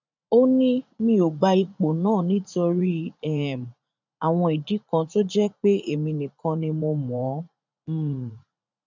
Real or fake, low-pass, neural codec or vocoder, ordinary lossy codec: fake; 7.2 kHz; vocoder, 44.1 kHz, 80 mel bands, Vocos; none